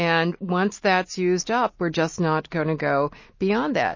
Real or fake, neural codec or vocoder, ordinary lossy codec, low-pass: real; none; MP3, 32 kbps; 7.2 kHz